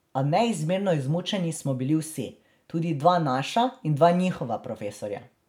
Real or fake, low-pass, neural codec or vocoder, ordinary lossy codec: real; 19.8 kHz; none; none